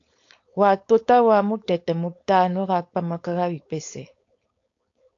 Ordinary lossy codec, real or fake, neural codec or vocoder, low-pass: AAC, 48 kbps; fake; codec, 16 kHz, 4.8 kbps, FACodec; 7.2 kHz